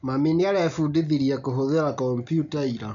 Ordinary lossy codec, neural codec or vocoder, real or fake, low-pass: none; none; real; 7.2 kHz